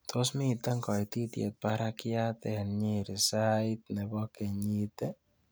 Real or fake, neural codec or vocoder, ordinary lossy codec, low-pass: real; none; none; none